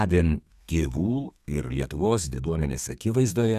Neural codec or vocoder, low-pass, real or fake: codec, 32 kHz, 1.9 kbps, SNAC; 14.4 kHz; fake